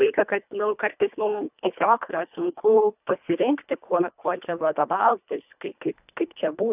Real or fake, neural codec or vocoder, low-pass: fake; codec, 24 kHz, 1.5 kbps, HILCodec; 3.6 kHz